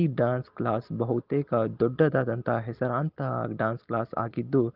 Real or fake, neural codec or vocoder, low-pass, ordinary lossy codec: real; none; 5.4 kHz; Opus, 16 kbps